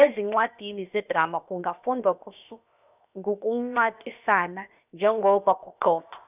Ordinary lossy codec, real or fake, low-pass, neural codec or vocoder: none; fake; 3.6 kHz; codec, 16 kHz, 0.7 kbps, FocalCodec